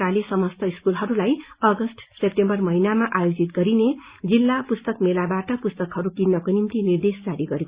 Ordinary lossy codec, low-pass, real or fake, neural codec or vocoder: Opus, 64 kbps; 3.6 kHz; real; none